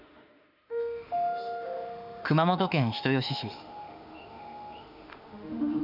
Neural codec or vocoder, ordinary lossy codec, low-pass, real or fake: autoencoder, 48 kHz, 32 numbers a frame, DAC-VAE, trained on Japanese speech; none; 5.4 kHz; fake